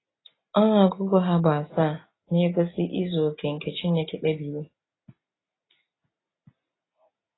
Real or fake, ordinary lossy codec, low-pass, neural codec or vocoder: real; AAC, 16 kbps; 7.2 kHz; none